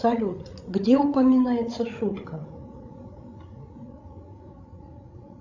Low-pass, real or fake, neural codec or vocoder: 7.2 kHz; fake; codec, 16 kHz, 16 kbps, FreqCodec, larger model